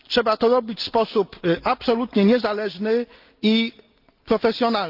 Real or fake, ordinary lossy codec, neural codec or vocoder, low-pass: real; Opus, 32 kbps; none; 5.4 kHz